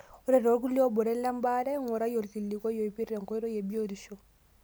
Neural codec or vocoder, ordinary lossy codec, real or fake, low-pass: none; none; real; none